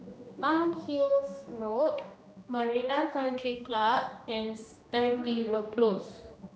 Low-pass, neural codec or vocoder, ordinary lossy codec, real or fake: none; codec, 16 kHz, 1 kbps, X-Codec, HuBERT features, trained on general audio; none; fake